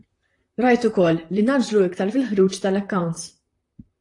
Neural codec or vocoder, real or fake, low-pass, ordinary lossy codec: vocoder, 44.1 kHz, 128 mel bands, Pupu-Vocoder; fake; 10.8 kHz; AAC, 48 kbps